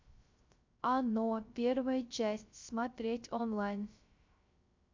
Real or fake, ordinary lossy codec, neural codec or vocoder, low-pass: fake; MP3, 64 kbps; codec, 16 kHz, 0.3 kbps, FocalCodec; 7.2 kHz